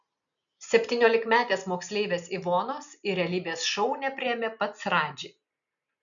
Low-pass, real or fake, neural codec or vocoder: 7.2 kHz; real; none